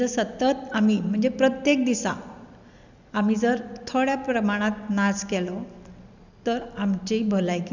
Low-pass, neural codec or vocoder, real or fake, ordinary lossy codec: 7.2 kHz; none; real; none